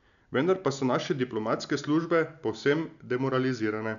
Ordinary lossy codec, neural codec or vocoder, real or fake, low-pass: none; none; real; 7.2 kHz